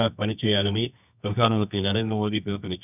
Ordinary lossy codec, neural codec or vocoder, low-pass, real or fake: none; codec, 24 kHz, 0.9 kbps, WavTokenizer, medium music audio release; 3.6 kHz; fake